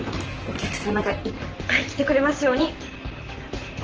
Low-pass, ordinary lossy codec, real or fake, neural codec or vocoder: 7.2 kHz; Opus, 16 kbps; fake; vocoder, 44.1 kHz, 128 mel bands, Pupu-Vocoder